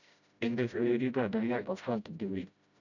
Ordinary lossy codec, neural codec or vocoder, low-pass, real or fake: none; codec, 16 kHz, 0.5 kbps, FreqCodec, smaller model; 7.2 kHz; fake